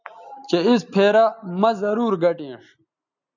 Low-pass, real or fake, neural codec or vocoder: 7.2 kHz; real; none